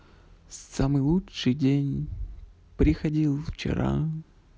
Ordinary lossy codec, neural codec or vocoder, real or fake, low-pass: none; none; real; none